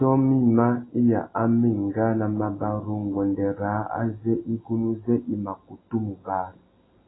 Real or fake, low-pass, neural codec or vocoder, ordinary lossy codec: real; 7.2 kHz; none; AAC, 16 kbps